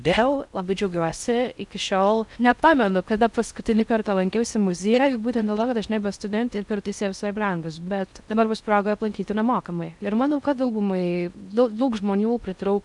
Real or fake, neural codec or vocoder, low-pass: fake; codec, 16 kHz in and 24 kHz out, 0.6 kbps, FocalCodec, streaming, 4096 codes; 10.8 kHz